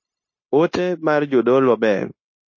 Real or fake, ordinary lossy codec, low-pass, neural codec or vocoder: fake; MP3, 32 kbps; 7.2 kHz; codec, 16 kHz, 0.9 kbps, LongCat-Audio-Codec